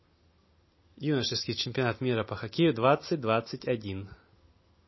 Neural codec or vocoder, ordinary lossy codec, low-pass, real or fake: none; MP3, 24 kbps; 7.2 kHz; real